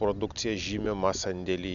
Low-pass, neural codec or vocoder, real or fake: 7.2 kHz; none; real